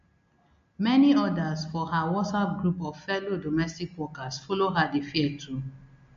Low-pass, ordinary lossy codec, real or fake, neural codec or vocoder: 7.2 kHz; MP3, 48 kbps; real; none